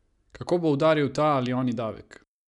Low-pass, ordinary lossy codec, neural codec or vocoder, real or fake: 10.8 kHz; none; none; real